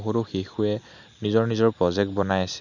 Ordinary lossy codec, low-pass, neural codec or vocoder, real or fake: none; 7.2 kHz; none; real